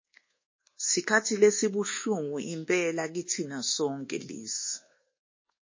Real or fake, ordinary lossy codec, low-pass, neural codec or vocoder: fake; MP3, 32 kbps; 7.2 kHz; codec, 24 kHz, 1.2 kbps, DualCodec